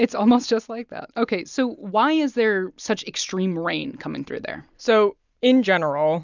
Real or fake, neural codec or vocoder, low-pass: real; none; 7.2 kHz